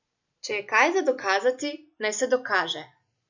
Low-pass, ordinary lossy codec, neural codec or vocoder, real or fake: 7.2 kHz; none; none; real